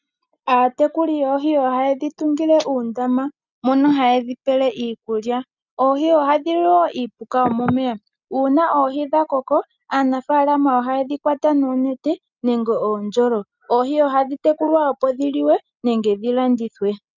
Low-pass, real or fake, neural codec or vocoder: 7.2 kHz; real; none